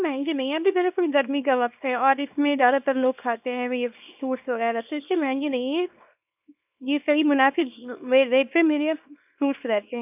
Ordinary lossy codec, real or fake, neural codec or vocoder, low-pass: none; fake; codec, 24 kHz, 0.9 kbps, WavTokenizer, small release; 3.6 kHz